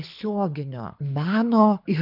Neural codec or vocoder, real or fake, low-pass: codec, 24 kHz, 6 kbps, HILCodec; fake; 5.4 kHz